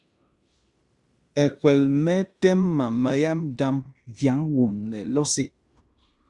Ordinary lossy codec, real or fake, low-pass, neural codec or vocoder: Opus, 64 kbps; fake; 10.8 kHz; codec, 16 kHz in and 24 kHz out, 0.9 kbps, LongCat-Audio-Codec, fine tuned four codebook decoder